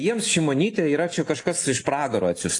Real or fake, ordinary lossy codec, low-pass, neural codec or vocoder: real; AAC, 48 kbps; 10.8 kHz; none